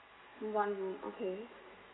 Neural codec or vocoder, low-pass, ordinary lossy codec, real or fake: none; 7.2 kHz; AAC, 16 kbps; real